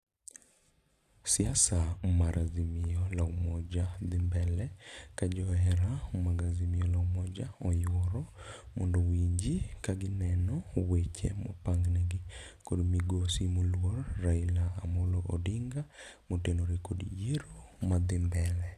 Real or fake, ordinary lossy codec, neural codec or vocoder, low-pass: real; none; none; 14.4 kHz